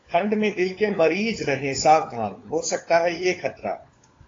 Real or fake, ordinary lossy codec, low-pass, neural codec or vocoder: fake; AAC, 32 kbps; 7.2 kHz; codec, 16 kHz, 4 kbps, FunCodec, trained on LibriTTS, 50 frames a second